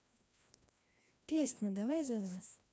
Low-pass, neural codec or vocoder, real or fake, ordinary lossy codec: none; codec, 16 kHz, 1 kbps, FreqCodec, larger model; fake; none